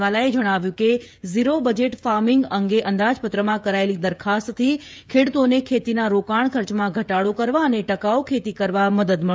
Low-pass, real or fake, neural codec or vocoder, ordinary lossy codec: none; fake; codec, 16 kHz, 16 kbps, FreqCodec, smaller model; none